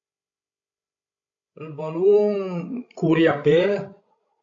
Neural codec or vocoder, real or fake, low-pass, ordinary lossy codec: codec, 16 kHz, 16 kbps, FreqCodec, larger model; fake; 7.2 kHz; AAC, 64 kbps